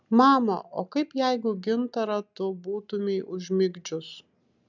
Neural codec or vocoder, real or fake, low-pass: none; real; 7.2 kHz